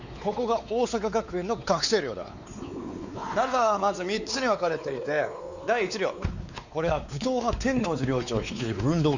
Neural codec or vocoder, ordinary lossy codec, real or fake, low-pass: codec, 16 kHz, 4 kbps, X-Codec, WavLM features, trained on Multilingual LibriSpeech; none; fake; 7.2 kHz